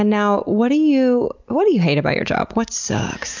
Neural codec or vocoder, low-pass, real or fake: none; 7.2 kHz; real